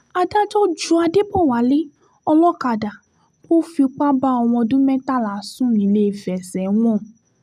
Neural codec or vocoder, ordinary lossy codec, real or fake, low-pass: none; none; real; 14.4 kHz